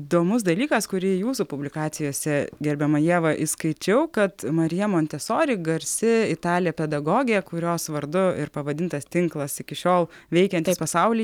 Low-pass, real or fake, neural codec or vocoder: 19.8 kHz; real; none